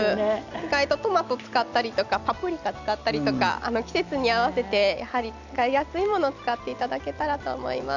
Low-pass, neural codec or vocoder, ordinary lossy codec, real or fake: 7.2 kHz; none; none; real